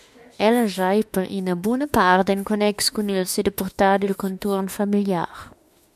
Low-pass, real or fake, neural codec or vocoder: 14.4 kHz; fake; autoencoder, 48 kHz, 32 numbers a frame, DAC-VAE, trained on Japanese speech